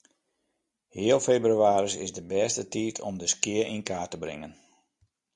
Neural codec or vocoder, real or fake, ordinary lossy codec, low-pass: none; real; Opus, 64 kbps; 10.8 kHz